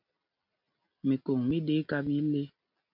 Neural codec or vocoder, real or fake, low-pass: none; real; 5.4 kHz